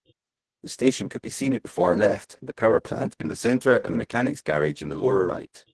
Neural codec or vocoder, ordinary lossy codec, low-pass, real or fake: codec, 24 kHz, 0.9 kbps, WavTokenizer, medium music audio release; Opus, 16 kbps; 10.8 kHz; fake